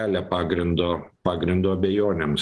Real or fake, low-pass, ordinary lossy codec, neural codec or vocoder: real; 10.8 kHz; Opus, 16 kbps; none